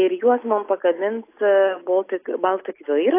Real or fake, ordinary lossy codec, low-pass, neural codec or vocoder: real; AAC, 24 kbps; 3.6 kHz; none